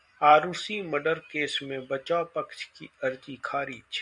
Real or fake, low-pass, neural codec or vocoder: real; 10.8 kHz; none